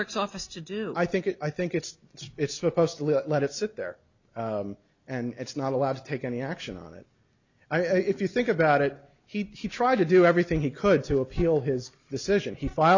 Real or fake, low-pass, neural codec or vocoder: real; 7.2 kHz; none